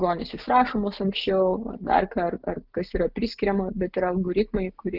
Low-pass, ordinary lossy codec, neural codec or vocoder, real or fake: 5.4 kHz; Opus, 32 kbps; none; real